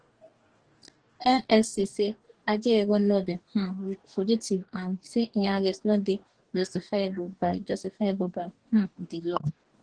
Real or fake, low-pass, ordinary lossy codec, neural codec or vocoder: fake; 9.9 kHz; Opus, 16 kbps; codec, 44.1 kHz, 2.6 kbps, DAC